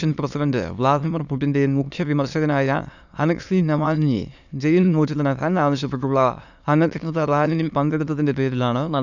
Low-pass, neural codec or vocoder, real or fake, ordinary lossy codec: 7.2 kHz; autoencoder, 22.05 kHz, a latent of 192 numbers a frame, VITS, trained on many speakers; fake; none